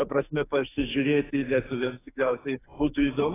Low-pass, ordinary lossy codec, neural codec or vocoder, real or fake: 3.6 kHz; AAC, 16 kbps; codec, 44.1 kHz, 2.6 kbps, SNAC; fake